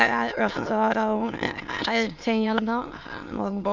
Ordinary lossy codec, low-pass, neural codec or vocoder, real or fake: MP3, 64 kbps; 7.2 kHz; autoencoder, 22.05 kHz, a latent of 192 numbers a frame, VITS, trained on many speakers; fake